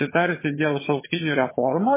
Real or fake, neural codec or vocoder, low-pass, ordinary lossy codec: fake; vocoder, 22.05 kHz, 80 mel bands, HiFi-GAN; 3.6 kHz; MP3, 16 kbps